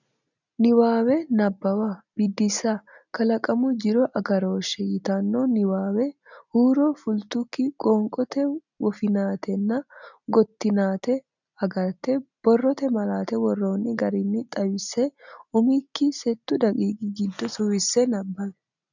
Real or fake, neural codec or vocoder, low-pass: real; none; 7.2 kHz